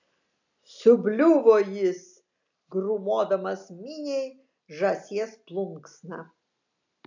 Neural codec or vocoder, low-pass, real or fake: none; 7.2 kHz; real